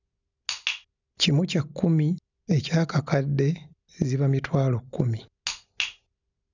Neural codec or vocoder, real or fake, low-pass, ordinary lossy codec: none; real; 7.2 kHz; none